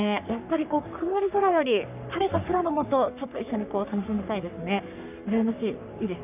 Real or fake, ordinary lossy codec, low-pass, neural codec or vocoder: fake; none; 3.6 kHz; codec, 44.1 kHz, 3.4 kbps, Pupu-Codec